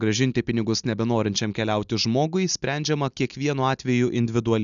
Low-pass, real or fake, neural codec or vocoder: 7.2 kHz; real; none